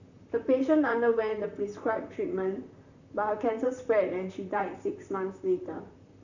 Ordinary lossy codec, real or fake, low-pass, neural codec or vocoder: none; fake; 7.2 kHz; vocoder, 44.1 kHz, 128 mel bands, Pupu-Vocoder